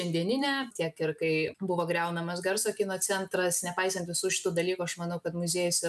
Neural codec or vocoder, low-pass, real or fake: none; 14.4 kHz; real